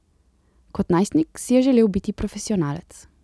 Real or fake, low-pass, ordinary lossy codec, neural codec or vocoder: real; none; none; none